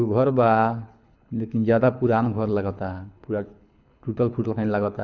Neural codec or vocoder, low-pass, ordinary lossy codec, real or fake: codec, 24 kHz, 6 kbps, HILCodec; 7.2 kHz; none; fake